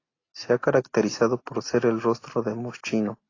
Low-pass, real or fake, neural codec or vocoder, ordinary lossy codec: 7.2 kHz; real; none; AAC, 32 kbps